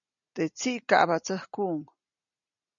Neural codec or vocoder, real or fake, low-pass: none; real; 7.2 kHz